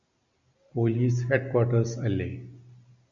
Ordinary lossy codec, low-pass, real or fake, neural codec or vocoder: MP3, 64 kbps; 7.2 kHz; real; none